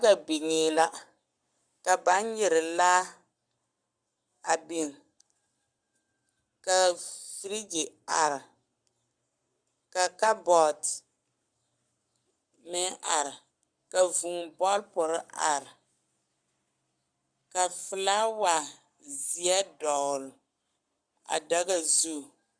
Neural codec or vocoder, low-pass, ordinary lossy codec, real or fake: codec, 44.1 kHz, 7.8 kbps, Pupu-Codec; 9.9 kHz; Opus, 64 kbps; fake